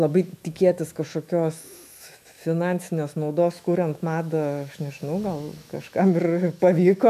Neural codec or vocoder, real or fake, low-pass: autoencoder, 48 kHz, 128 numbers a frame, DAC-VAE, trained on Japanese speech; fake; 14.4 kHz